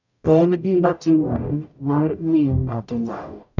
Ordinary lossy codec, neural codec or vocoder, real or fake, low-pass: none; codec, 44.1 kHz, 0.9 kbps, DAC; fake; 7.2 kHz